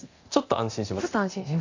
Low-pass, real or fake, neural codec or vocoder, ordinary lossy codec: 7.2 kHz; fake; codec, 24 kHz, 0.9 kbps, DualCodec; AAC, 48 kbps